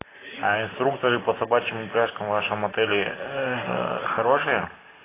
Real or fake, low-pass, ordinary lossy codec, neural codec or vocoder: real; 3.6 kHz; AAC, 16 kbps; none